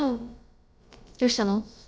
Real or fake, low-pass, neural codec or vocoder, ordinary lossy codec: fake; none; codec, 16 kHz, about 1 kbps, DyCAST, with the encoder's durations; none